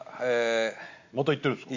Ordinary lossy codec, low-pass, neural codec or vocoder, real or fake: MP3, 48 kbps; 7.2 kHz; none; real